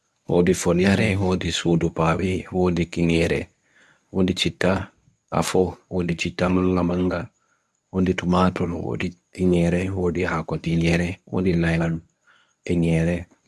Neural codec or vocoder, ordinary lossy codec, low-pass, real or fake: codec, 24 kHz, 0.9 kbps, WavTokenizer, medium speech release version 1; none; none; fake